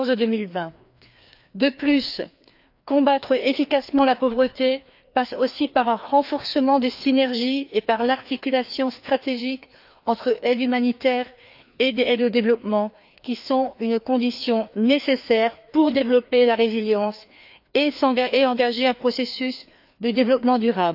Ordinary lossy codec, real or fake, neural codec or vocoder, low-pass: AAC, 48 kbps; fake; codec, 16 kHz, 2 kbps, FreqCodec, larger model; 5.4 kHz